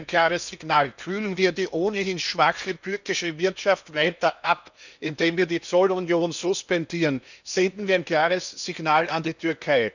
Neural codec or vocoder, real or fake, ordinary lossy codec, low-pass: codec, 16 kHz in and 24 kHz out, 0.8 kbps, FocalCodec, streaming, 65536 codes; fake; none; 7.2 kHz